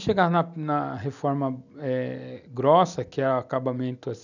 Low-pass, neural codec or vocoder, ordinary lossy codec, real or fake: 7.2 kHz; none; none; real